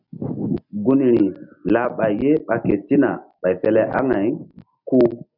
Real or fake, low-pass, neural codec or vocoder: real; 5.4 kHz; none